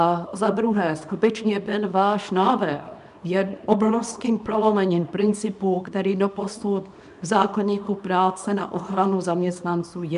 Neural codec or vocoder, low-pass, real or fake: codec, 24 kHz, 0.9 kbps, WavTokenizer, small release; 10.8 kHz; fake